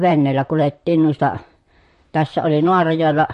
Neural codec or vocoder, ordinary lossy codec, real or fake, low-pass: vocoder, 44.1 kHz, 128 mel bands, Pupu-Vocoder; MP3, 48 kbps; fake; 14.4 kHz